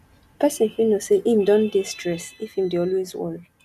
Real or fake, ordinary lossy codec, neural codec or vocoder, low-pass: real; none; none; 14.4 kHz